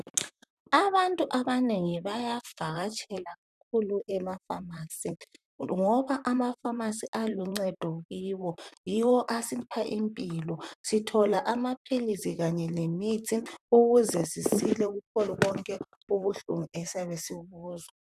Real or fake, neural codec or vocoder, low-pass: fake; vocoder, 44.1 kHz, 128 mel bands, Pupu-Vocoder; 14.4 kHz